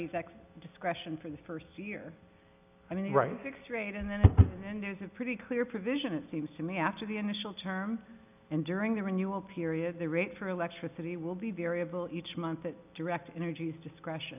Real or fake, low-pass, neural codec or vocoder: real; 3.6 kHz; none